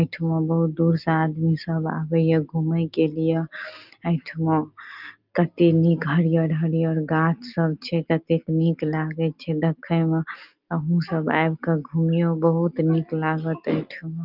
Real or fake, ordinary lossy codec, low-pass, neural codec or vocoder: real; Opus, 32 kbps; 5.4 kHz; none